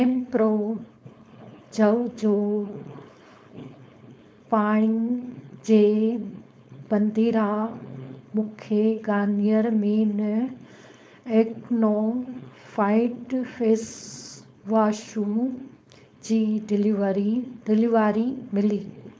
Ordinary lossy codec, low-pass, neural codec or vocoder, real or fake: none; none; codec, 16 kHz, 4.8 kbps, FACodec; fake